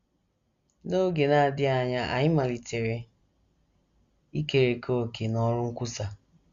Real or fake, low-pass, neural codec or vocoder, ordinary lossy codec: real; 7.2 kHz; none; Opus, 64 kbps